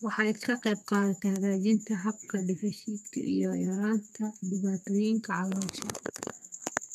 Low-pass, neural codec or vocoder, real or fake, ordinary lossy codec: 14.4 kHz; codec, 32 kHz, 1.9 kbps, SNAC; fake; none